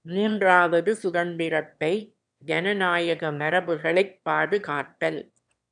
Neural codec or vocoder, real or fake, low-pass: autoencoder, 22.05 kHz, a latent of 192 numbers a frame, VITS, trained on one speaker; fake; 9.9 kHz